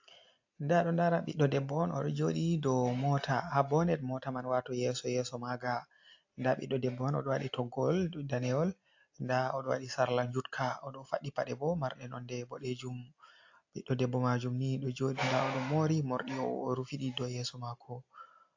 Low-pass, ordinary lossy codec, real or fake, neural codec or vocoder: 7.2 kHz; AAC, 48 kbps; real; none